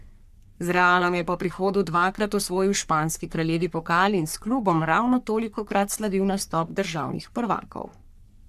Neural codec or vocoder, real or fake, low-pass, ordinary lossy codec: codec, 44.1 kHz, 3.4 kbps, Pupu-Codec; fake; 14.4 kHz; none